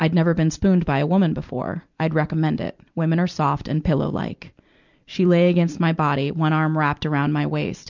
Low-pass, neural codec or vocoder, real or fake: 7.2 kHz; vocoder, 44.1 kHz, 128 mel bands every 256 samples, BigVGAN v2; fake